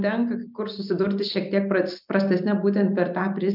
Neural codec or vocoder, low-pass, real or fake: none; 5.4 kHz; real